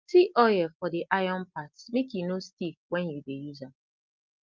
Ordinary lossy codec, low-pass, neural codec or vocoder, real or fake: Opus, 24 kbps; 7.2 kHz; none; real